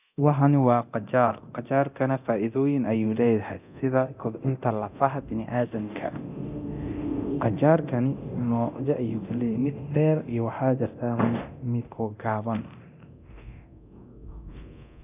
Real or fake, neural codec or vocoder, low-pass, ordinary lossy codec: fake; codec, 24 kHz, 0.9 kbps, DualCodec; 3.6 kHz; none